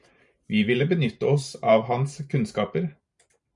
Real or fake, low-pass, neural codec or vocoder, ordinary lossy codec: fake; 10.8 kHz; vocoder, 44.1 kHz, 128 mel bands every 512 samples, BigVGAN v2; MP3, 96 kbps